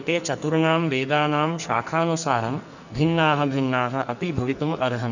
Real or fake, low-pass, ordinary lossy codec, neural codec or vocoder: fake; 7.2 kHz; none; codec, 44.1 kHz, 2.6 kbps, SNAC